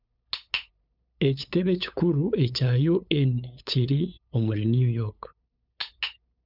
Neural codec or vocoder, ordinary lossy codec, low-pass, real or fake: codec, 16 kHz, 8 kbps, FunCodec, trained on LibriTTS, 25 frames a second; none; 5.4 kHz; fake